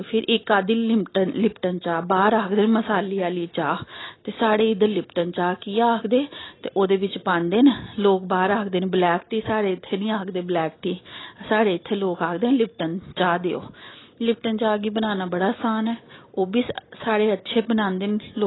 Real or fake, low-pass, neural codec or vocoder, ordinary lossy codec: real; 7.2 kHz; none; AAC, 16 kbps